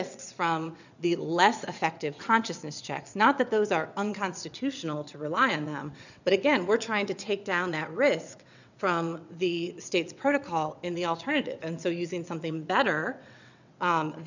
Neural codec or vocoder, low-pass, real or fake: vocoder, 22.05 kHz, 80 mel bands, WaveNeXt; 7.2 kHz; fake